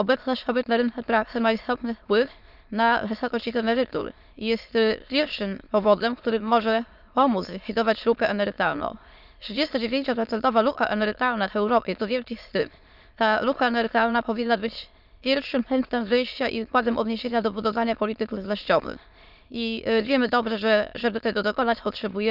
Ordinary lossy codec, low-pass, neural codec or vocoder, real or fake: none; 5.4 kHz; autoencoder, 22.05 kHz, a latent of 192 numbers a frame, VITS, trained on many speakers; fake